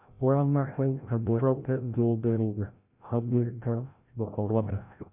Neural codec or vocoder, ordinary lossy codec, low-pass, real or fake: codec, 16 kHz, 0.5 kbps, FreqCodec, larger model; MP3, 32 kbps; 3.6 kHz; fake